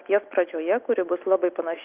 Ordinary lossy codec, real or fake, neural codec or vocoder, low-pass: Opus, 32 kbps; real; none; 3.6 kHz